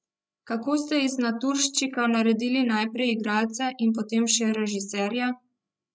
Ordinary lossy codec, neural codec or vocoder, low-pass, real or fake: none; codec, 16 kHz, 16 kbps, FreqCodec, larger model; none; fake